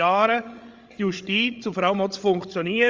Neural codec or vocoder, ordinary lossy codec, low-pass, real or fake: codec, 16 kHz, 16 kbps, FreqCodec, larger model; Opus, 32 kbps; 7.2 kHz; fake